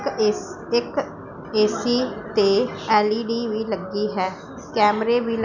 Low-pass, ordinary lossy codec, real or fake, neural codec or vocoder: 7.2 kHz; none; real; none